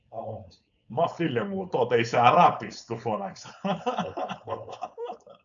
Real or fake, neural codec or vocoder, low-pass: fake; codec, 16 kHz, 4.8 kbps, FACodec; 7.2 kHz